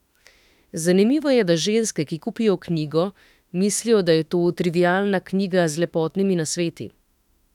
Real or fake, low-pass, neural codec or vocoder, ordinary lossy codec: fake; 19.8 kHz; autoencoder, 48 kHz, 32 numbers a frame, DAC-VAE, trained on Japanese speech; none